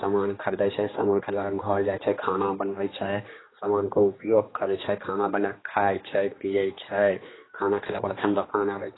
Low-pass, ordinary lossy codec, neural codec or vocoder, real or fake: 7.2 kHz; AAC, 16 kbps; codec, 16 kHz, 2 kbps, X-Codec, HuBERT features, trained on general audio; fake